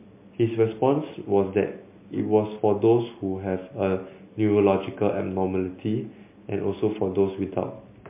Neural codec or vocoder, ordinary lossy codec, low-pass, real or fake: none; MP3, 24 kbps; 3.6 kHz; real